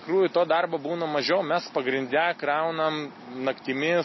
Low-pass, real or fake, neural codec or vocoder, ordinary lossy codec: 7.2 kHz; real; none; MP3, 24 kbps